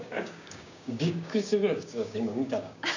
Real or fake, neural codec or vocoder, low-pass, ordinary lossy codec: fake; codec, 16 kHz, 6 kbps, DAC; 7.2 kHz; none